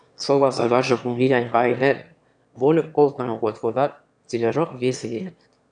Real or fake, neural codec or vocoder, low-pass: fake; autoencoder, 22.05 kHz, a latent of 192 numbers a frame, VITS, trained on one speaker; 9.9 kHz